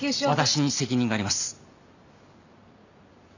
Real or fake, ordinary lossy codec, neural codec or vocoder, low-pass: real; none; none; 7.2 kHz